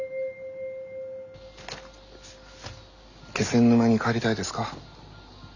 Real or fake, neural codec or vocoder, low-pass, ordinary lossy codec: real; none; 7.2 kHz; none